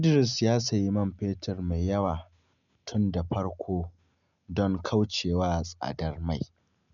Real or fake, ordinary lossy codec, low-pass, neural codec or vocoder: real; none; 7.2 kHz; none